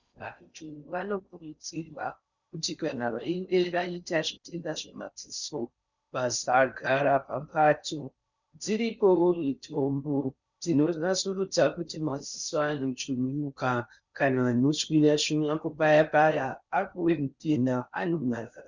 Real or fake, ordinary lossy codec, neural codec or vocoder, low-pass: fake; Opus, 64 kbps; codec, 16 kHz in and 24 kHz out, 0.6 kbps, FocalCodec, streaming, 4096 codes; 7.2 kHz